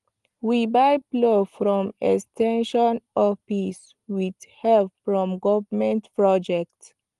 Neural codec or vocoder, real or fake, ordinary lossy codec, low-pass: none; real; Opus, 24 kbps; 10.8 kHz